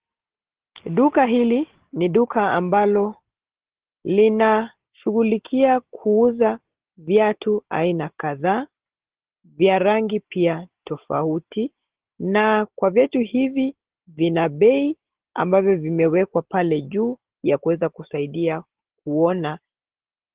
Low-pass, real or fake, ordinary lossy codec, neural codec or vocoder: 3.6 kHz; real; Opus, 16 kbps; none